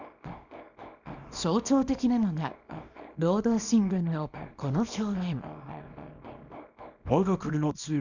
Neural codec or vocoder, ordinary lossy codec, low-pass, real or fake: codec, 24 kHz, 0.9 kbps, WavTokenizer, small release; none; 7.2 kHz; fake